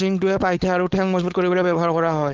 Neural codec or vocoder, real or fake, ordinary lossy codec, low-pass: codec, 16 kHz, 4.8 kbps, FACodec; fake; Opus, 16 kbps; 7.2 kHz